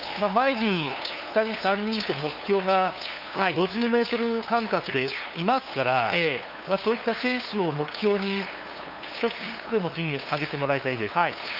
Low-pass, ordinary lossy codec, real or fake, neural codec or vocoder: 5.4 kHz; none; fake; codec, 16 kHz, 2 kbps, FunCodec, trained on LibriTTS, 25 frames a second